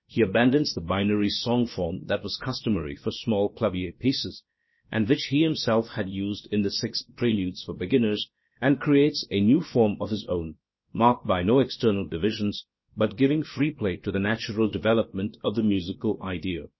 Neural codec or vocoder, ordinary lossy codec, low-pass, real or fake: codec, 16 kHz, about 1 kbps, DyCAST, with the encoder's durations; MP3, 24 kbps; 7.2 kHz; fake